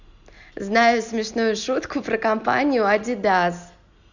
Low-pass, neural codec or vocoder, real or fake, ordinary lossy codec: 7.2 kHz; none; real; none